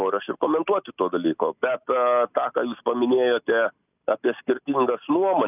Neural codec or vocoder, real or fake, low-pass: none; real; 3.6 kHz